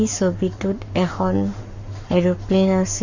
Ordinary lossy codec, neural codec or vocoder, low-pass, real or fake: none; codec, 44.1 kHz, 7.8 kbps, Pupu-Codec; 7.2 kHz; fake